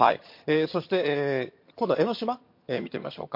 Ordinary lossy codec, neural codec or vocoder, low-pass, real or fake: MP3, 32 kbps; vocoder, 22.05 kHz, 80 mel bands, HiFi-GAN; 5.4 kHz; fake